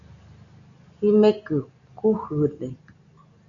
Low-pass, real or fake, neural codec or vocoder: 7.2 kHz; real; none